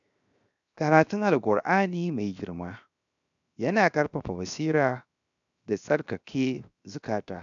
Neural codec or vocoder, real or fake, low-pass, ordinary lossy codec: codec, 16 kHz, 0.7 kbps, FocalCodec; fake; 7.2 kHz; none